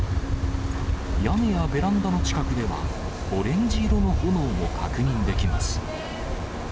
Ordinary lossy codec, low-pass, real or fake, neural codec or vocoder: none; none; real; none